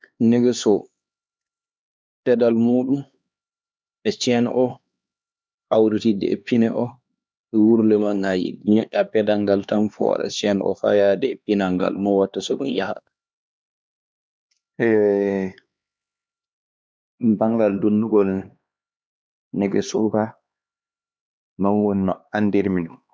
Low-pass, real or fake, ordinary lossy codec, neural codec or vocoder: none; fake; none; codec, 16 kHz, 2 kbps, X-Codec, HuBERT features, trained on LibriSpeech